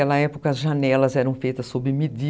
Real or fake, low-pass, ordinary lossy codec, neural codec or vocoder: real; none; none; none